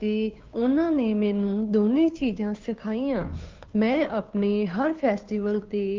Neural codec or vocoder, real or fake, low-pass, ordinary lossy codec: codec, 16 kHz, 2 kbps, FunCodec, trained on Chinese and English, 25 frames a second; fake; 7.2 kHz; Opus, 24 kbps